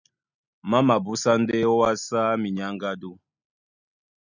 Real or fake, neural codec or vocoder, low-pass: real; none; 7.2 kHz